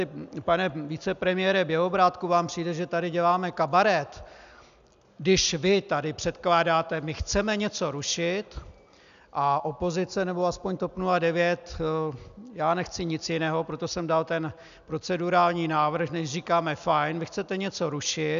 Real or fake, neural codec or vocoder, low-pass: real; none; 7.2 kHz